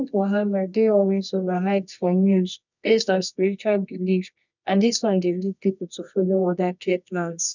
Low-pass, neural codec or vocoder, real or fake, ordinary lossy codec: 7.2 kHz; codec, 24 kHz, 0.9 kbps, WavTokenizer, medium music audio release; fake; none